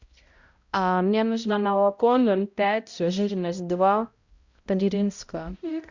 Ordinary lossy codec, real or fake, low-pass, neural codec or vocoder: Opus, 64 kbps; fake; 7.2 kHz; codec, 16 kHz, 0.5 kbps, X-Codec, HuBERT features, trained on balanced general audio